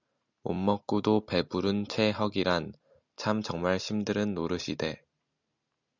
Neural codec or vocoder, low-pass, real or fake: none; 7.2 kHz; real